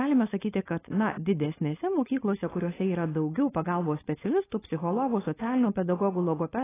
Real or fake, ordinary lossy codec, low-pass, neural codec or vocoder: fake; AAC, 16 kbps; 3.6 kHz; codec, 16 kHz, 4.8 kbps, FACodec